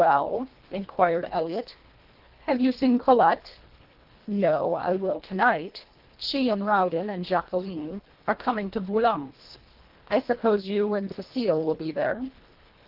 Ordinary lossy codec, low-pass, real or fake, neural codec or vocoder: Opus, 16 kbps; 5.4 kHz; fake; codec, 24 kHz, 1.5 kbps, HILCodec